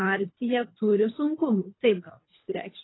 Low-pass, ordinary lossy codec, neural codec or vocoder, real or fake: 7.2 kHz; AAC, 16 kbps; codec, 24 kHz, 3 kbps, HILCodec; fake